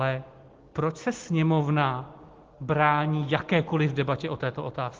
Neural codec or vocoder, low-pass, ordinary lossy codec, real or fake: none; 7.2 kHz; Opus, 16 kbps; real